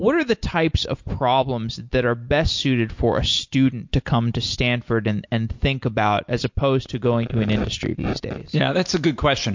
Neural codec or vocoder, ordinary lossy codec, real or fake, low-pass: none; MP3, 48 kbps; real; 7.2 kHz